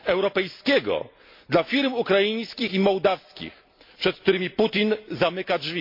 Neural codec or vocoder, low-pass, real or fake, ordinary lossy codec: none; 5.4 kHz; real; MP3, 32 kbps